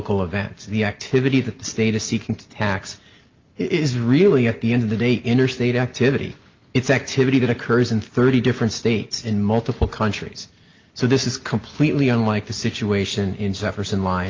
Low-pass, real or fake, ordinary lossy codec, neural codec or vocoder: 7.2 kHz; real; Opus, 24 kbps; none